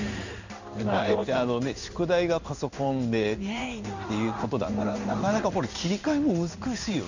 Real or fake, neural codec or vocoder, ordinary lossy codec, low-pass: fake; codec, 16 kHz in and 24 kHz out, 1 kbps, XY-Tokenizer; none; 7.2 kHz